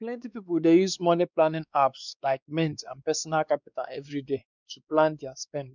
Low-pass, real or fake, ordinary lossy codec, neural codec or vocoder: 7.2 kHz; fake; none; codec, 16 kHz, 2 kbps, X-Codec, WavLM features, trained on Multilingual LibriSpeech